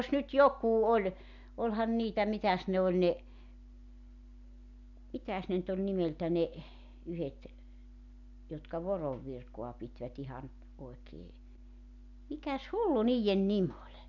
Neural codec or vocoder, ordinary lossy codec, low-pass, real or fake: none; none; 7.2 kHz; real